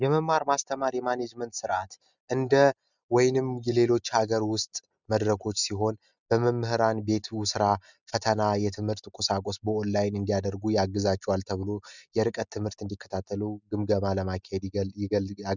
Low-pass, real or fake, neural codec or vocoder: 7.2 kHz; real; none